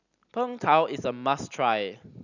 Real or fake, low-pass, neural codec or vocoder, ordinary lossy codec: real; 7.2 kHz; none; none